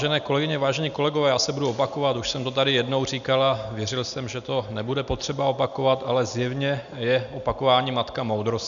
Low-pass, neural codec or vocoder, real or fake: 7.2 kHz; none; real